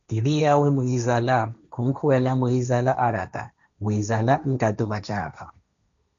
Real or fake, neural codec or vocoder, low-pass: fake; codec, 16 kHz, 1.1 kbps, Voila-Tokenizer; 7.2 kHz